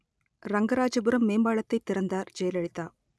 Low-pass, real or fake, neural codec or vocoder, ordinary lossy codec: none; real; none; none